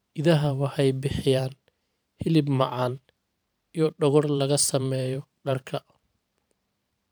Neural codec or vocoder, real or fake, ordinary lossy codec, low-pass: vocoder, 44.1 kHz, 128 mel bands every 512 samples, BigVGAN v2; fake; none; none